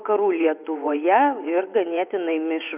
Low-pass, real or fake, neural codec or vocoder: 3.6 kHz; fake; vocoder, 24 kHz, 100 mel bands, Vocos